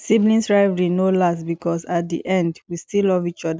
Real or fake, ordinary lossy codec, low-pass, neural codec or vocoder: real; none; none; none